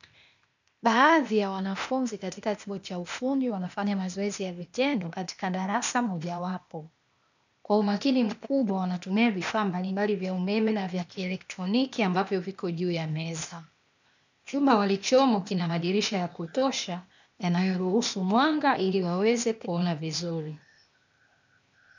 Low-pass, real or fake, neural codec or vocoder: 7.2 kHz; fake; codec, 16 kHz, 0.8 kbps, ZipCodec